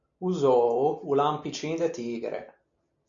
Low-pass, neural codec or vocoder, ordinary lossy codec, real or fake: 7.2 kHz; none; MP3, 96 kbps; real